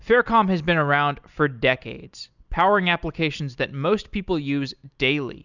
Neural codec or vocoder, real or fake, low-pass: none; real; 7.2 kHz